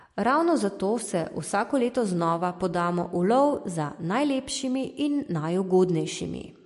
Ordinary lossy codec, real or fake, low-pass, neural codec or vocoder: MP3, 48 kbps; real; 14.4 kHz; none